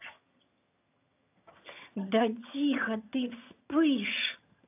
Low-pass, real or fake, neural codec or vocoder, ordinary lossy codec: 3.6 kHz; fake; vocoder, 22.05 kHz, 80 mel bands, HiFi-GAN; AAC, 32 kbps